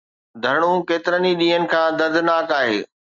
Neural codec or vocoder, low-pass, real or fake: none; 7.2 kHz; real